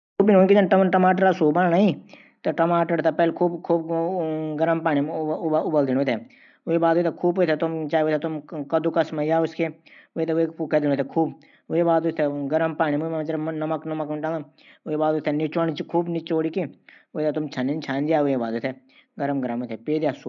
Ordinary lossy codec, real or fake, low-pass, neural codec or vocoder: none; real; 7.2 kHz; none